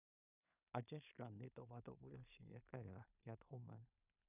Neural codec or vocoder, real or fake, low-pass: codec, 16 kHz in and 24 kHz out, 0.4 kbps, LongCat-Audio-Codec, two codebook decoder; fake; 3.6 kHz